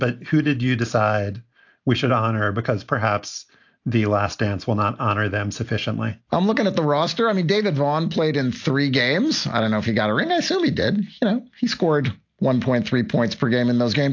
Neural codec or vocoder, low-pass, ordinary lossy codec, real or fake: none; 7.2 kHz; AAC, 48 kbps; real